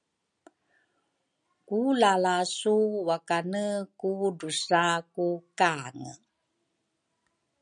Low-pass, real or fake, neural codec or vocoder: 9.9 kHz; real; none